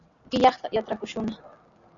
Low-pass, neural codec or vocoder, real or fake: 7.2 kHz; none; real